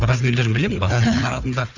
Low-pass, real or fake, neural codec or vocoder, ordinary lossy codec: 7.2 kHz; fake; codec, 16 kHz, 4 kbps, FunCodec, trained on Chinese and English, 50 frames a second; none